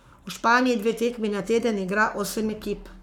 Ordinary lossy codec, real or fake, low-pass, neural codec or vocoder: none; fake; 19.8 kHz; codec, 44.1 kHz, 7.8 kbps, Pupu-Codec